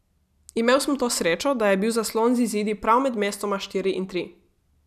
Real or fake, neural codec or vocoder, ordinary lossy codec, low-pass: real; none; none; 14.4 kHz